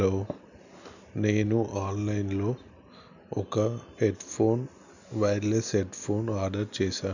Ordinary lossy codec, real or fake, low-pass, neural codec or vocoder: none; real; 7.2 kHz; none